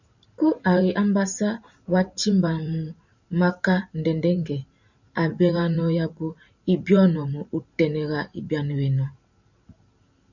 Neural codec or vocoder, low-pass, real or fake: vocoder, 44.1 kHz, 128 mel bands every 512 samples, BigVGAN v2; 7.2 kHz; fake